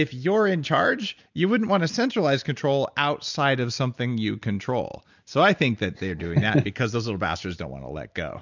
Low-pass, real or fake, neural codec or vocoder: 7.2 kHz; real; none